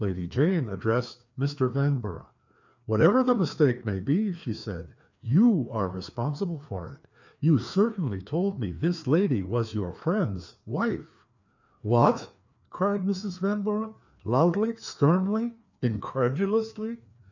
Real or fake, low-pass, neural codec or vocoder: fake; 7.2 kHz; codec, 16 kHz, 2 kbps, FreqCodec, larger model